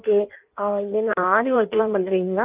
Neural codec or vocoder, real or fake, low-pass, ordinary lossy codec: codec, 16 kHz in and 24 kHz out, 1.1 kbps, FireRedTTS-2 codec; fake; 3.6 kHz; Opus, 32 kbps